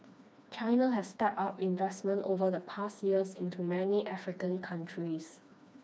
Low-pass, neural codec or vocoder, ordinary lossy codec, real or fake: none; codec, 16 kHz, 2 kbps, FreqCodec, smaller model; none; fake